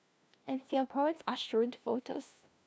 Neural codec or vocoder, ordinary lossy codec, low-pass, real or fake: codec, 16 kHz, 1 kbps, FunCodec, trained on LibriTTS, 50 frames a second; none; none; fake